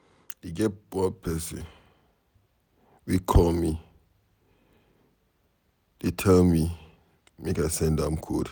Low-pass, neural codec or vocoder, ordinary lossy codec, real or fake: none; none; none; real